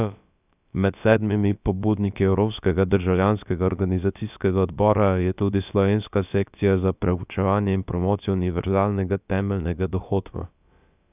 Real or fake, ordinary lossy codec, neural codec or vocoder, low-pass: fake; none; codec, 16 kHz, about 1 kbps, DyCAST, with the encoder's durations; 3.6 kHz